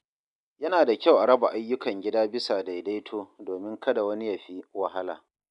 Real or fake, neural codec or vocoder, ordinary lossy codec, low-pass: real; none; none; 10.8 kHz